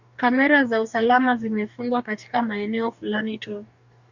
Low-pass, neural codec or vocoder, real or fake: 7.2 kHz; codec, 44.1 kHz, 2.6 kbps, DAC; fake